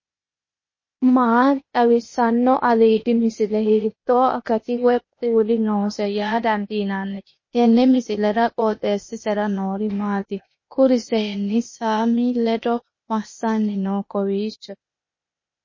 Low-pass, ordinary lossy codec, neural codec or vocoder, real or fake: 7.2 kHz; MP3, 32 kbps; codec, 16 kHz, 0.8 kbps, ZipCodec; fake